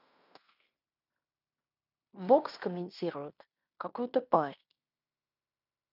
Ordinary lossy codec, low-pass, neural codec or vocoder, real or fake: none; 5.4 kHz; codec, 16 kHz in and 24 kHz out, 0.9 kbps, LongCat-Audio-Codec, fine tuned four codebook decoder; fake